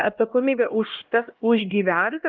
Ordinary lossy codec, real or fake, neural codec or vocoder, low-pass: Opus, 32 kbps; fake; codec, 16 kHz, 1 kbps, X-Codec, HuBERT features, trained on LibriSpeech; 7.2 kHz